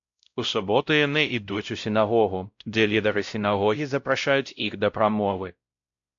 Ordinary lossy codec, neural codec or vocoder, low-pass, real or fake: AAC, 64 kbps; codec, 16 kHz, 0.5 kbps, X-Codec, WavLM features, trained on Multilingual LibriSpeech; 7.2 kHz; fake